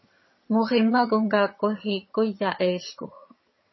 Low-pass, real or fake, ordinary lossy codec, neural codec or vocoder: 7.2 kHz; fake; MP3, 24 kbps; vocoder, 22.05 kHz, 80 mel bands, HiFi-GAN